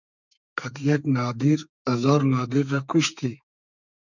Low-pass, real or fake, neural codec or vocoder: 7.2 kHz; fake; codec, 32 kHz, 1.9 kbps, SNAC